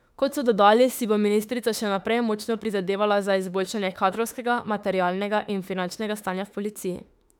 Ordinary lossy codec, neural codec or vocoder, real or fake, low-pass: none; autoencoder, 48 kHz, 32 numbers a frame, DAC-VAE, trained on Japanese speech; fake; 19.8 kHz